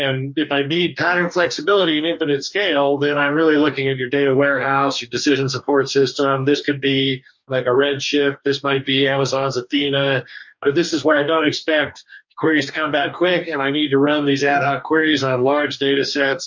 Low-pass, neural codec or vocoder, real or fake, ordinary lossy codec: 7.2 kHz; codec, 44.1 kHz, 2.6 kbps, DAC; fake; MP3, 48 kbps